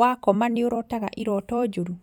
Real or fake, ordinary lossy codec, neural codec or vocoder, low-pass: fake; none; vocoder, 44.1 kHz, 128 mel bands every 256 samples, BigVGAN v2; 19.8 kHz